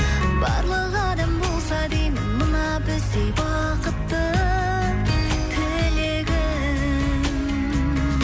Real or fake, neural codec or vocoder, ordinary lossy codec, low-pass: real; none; none; none